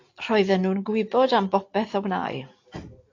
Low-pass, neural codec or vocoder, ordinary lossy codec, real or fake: 7.2 kHz; none; AAC, 48 kbps; real